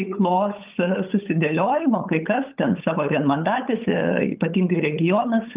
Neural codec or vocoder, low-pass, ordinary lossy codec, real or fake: codec, 16 kHz, 8 kbps, FunCodec, trained on LibriTTS, 25 frames a second; 3.6 kHz; Opus, 32 kbps; fake